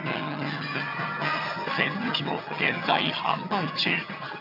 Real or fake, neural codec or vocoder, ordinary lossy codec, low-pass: fake; vocoder, 22.05 kHz, 80 mel bands, HiFi-GAN; none; 5.4 kHz